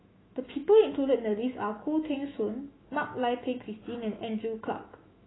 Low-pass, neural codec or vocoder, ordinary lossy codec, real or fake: 7.2 kHz; autoencoder, 48 kHz, 128 numbers a frame, DAC-VAE, trained on Japanese speech; AAC, 16 kbps; fake